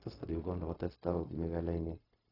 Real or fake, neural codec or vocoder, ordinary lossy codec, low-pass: fake; codec, 16 kHz, 0.4 kbps, LongCat-Audio-Codec; AAC, 24 kbps; 5.4 kHz